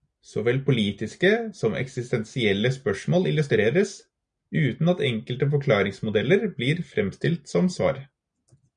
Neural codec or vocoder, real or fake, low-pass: none; real; 9.9 kHz